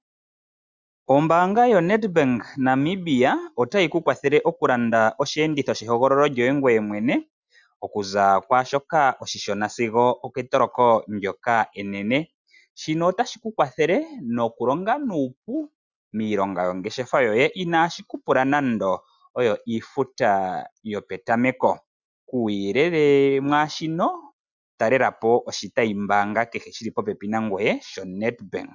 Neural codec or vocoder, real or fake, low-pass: none; real; 7.2 kHz